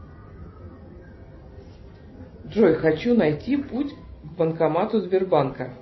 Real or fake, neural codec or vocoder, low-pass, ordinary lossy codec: real; none; 7.2 kHz; MP3, 24 kbps